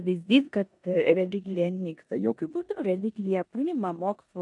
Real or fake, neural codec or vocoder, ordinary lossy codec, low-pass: fake; codec, 16 kHz in and 24 kHz out, 0.9 kbps, LongCat-Audio-Codec, four codebook decoder; MP3, 64 kbps; 10.8 kHz